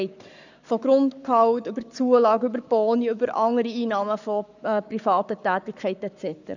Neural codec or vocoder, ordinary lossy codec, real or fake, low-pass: codec, 44.1 kHz, 7.8 kbps, Pupu-Codec; none; fake; 7.2 kHz